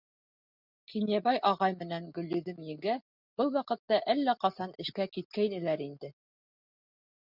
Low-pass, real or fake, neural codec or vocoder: 5.4 kHz; real; none